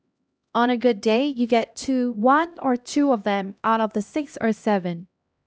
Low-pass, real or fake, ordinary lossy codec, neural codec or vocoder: none; fake; none; codec, 16 kHz, 1 kbps, X-Codec, HuBERT features, trained on LibriSpeech